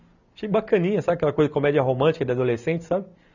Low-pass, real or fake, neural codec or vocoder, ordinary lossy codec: 7.2 kHz; real; none; none